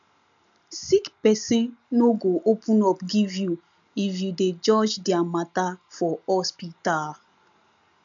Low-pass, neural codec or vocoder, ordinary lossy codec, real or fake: 7.2 kHz; none; none; real